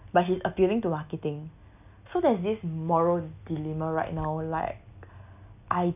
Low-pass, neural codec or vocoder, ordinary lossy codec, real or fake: 3.6 kHz; none; none; real